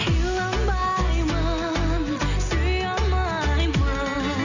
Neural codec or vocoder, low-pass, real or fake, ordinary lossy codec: none; 7.2 kHz; real; none